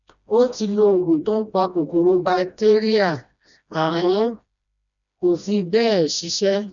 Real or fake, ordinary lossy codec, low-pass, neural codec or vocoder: fake; none; 7.2 kHz; codec, 16 kHz, 1 kbps, FreqCodec, smaller model